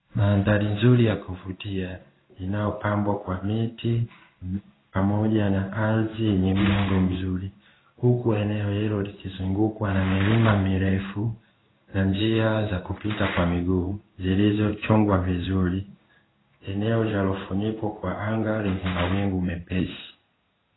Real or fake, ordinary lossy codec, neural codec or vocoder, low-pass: fake; AAC, 16 kbps; codec, 16 kHz in and 24 kHz out, 1 kbps, XY-Tokenizer; 7.2 kHz